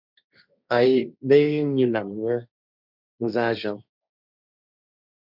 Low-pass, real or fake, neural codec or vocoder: 5.4 kHz; fake; codec, 16 kHz, 1.1 kbps, Voila-Tokenizer